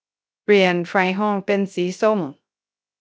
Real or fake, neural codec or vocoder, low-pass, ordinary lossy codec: fake; codec, 16 kHz, 0.3 kbps, FocalCodec; none; none